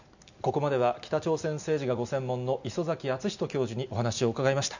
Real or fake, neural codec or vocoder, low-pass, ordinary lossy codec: real; none; 7.2 kHz; none